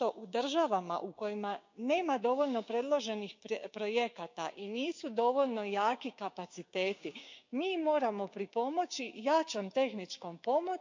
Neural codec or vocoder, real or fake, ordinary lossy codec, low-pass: codec, 16 kHz, 6 kbps, DAC; fake; MP3, 64 kbps; 7.2 kHz